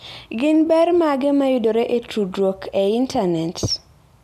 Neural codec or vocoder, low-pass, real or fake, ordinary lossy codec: none; 14.4 kHz; real; MP3, 96 kbps